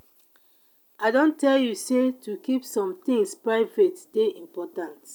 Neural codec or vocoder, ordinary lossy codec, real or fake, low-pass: none; none; real; 19.8 kHz